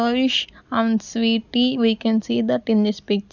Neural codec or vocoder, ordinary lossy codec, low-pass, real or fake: none; none; 7.2 kHz; real